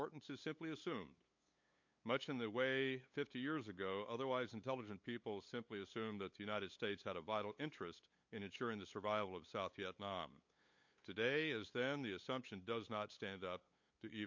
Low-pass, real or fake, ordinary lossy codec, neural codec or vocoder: 7.2 kHz; fake; MP3, 48 kbps; codec, 16 kHz, 8 kbps, FunCodec, trained on LibriTTS, 25 frames a second